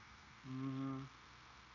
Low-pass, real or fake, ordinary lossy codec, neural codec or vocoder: 7.2 kHz; fake; MP3, 48 kbps; codec, 16 kHz, 0.9 kbps, LongCat-Audio-Codec